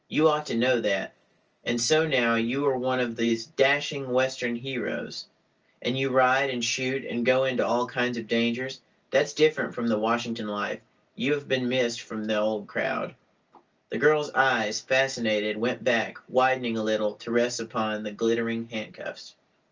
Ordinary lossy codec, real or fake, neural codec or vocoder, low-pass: Opus, 24 kbps; real; none; 7.2 kHz